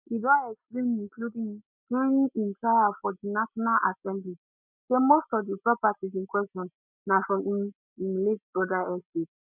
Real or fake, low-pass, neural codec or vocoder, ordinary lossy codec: real; 3.6 kHz; none; none